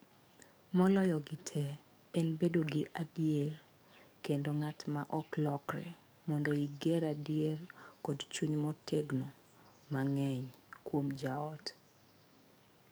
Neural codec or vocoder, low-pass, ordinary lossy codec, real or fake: codec, 44.1 kHz, 7.8 kbps, DAC; none; none; fake